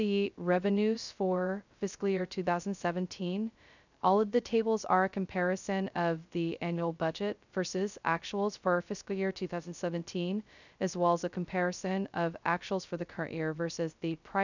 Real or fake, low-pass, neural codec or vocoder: fake; 7.2 kHz; codec, 16 kHz, 0.2 kbps, FocalCodec